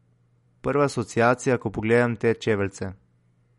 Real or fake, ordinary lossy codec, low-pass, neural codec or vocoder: real; MP3, 48 kbps; 19.8 kHz; none